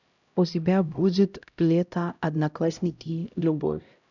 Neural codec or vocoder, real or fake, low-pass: codec, 16 kHz, 0.5 kbps, X-Codec, HuBERT features, trained on LibriSpeech; fake; 7.2 kHz